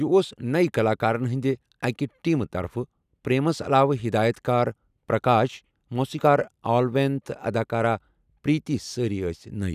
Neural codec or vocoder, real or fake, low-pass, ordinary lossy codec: none; real; 14.4 kHz; none